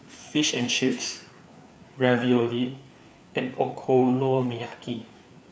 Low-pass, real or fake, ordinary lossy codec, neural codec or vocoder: none; fake; none; codec, 16 kHz, 4 kbps, FreqCodec, larger model